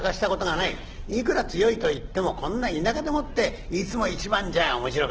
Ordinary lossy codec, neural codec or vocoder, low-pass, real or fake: Opus, 16 kbps; none; 7.2 kHz; real